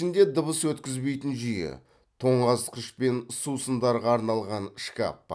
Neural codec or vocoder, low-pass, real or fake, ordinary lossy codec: none; none; real; none